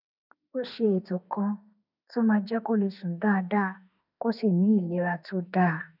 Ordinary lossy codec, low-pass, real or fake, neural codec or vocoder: none; 5.4 kHz; fake; autoencoder, 48 kHz, 32 numbers a frame, DAC-VAE, trained on Japanese speech